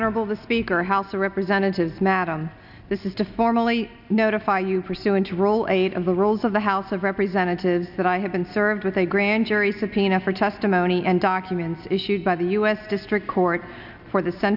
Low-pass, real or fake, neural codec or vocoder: 5.4 kHz; real; none